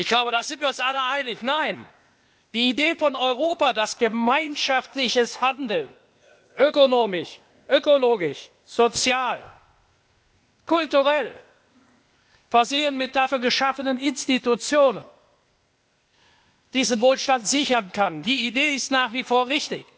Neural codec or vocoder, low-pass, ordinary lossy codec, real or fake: codec, 16 kHz, 0.8 kbps, ZipCodec; none; none; fake